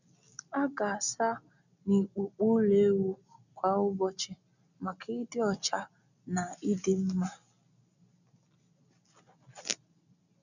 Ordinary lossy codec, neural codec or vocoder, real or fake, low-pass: none; none; real; 7.2 kHz